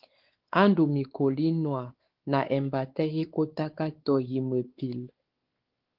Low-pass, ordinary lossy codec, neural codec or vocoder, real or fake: 5.4 kHz; Opus, 16 kbps; codec, 16 kHz, 4 kbps, X-Codec, WavLM features, trained on Multilingual LibriSpeech; fake